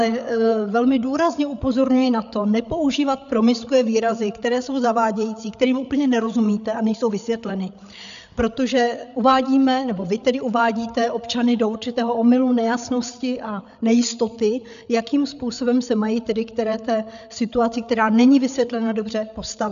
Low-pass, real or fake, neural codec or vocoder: 7.2 kHz; fake; codec, 16 kHz, 8 kbps, FreqCodec, larger model